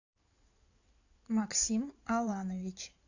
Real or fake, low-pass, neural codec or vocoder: fake; 7.2 kHz; codec, 16 kHz in and 24 kHz out, 2.2 kbps, FireRedTTS-2 codec